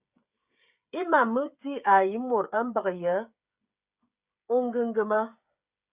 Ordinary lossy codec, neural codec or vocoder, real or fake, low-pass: Opus, 64 kbps; codec, 16 kHz, 16 kbps, FreqCodec, smaller model; fake; 3.6 kHz